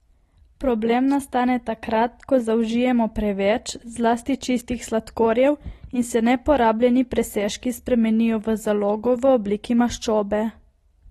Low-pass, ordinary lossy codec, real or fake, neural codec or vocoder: 14.4 kHz; AAC, 32 kbps; real; none